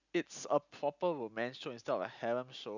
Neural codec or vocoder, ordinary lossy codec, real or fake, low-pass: none; none; real; 7.2 kHz